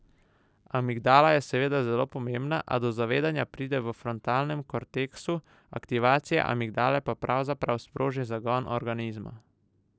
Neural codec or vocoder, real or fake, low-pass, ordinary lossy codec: none; real; none; none